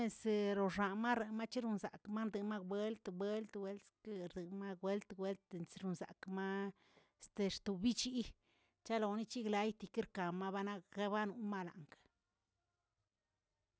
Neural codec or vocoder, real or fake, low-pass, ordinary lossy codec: none; real; none; none